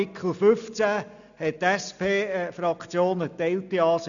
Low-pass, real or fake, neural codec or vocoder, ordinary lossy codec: 7.2 kHz; real; none; none